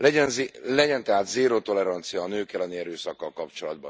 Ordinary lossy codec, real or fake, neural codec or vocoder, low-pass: none; real; none; none